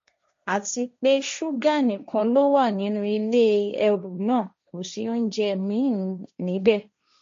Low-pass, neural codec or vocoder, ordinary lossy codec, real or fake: 7.2 kHz; codec, 16 kHz, 1.1 kbps, Voila-Tokenizer; MP3, 48 kbps; fake